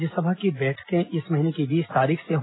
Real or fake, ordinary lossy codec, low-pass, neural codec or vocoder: real; AAC, 16 kbps; 7.2 kHz; none